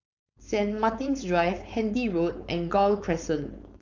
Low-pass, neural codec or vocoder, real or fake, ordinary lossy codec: 7.2 kHz; codec, 16 kHz, 4.8 kbps, FACodec; fake; none